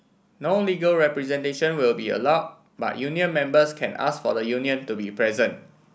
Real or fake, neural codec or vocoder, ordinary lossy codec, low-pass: real; none; none; none